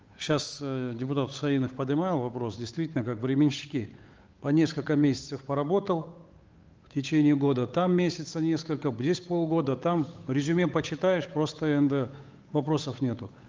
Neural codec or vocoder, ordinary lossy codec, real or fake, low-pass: codec, 16 kHz, 8 kbps, FunCodec, trained on Chinese and English, 25 frames a second; Opus, 24 kbps; fake; 7.2 kHz